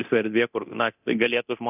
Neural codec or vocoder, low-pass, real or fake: codec, 24 kHz, 0.9 kbps, DualCodec; 3.6 kHz; fake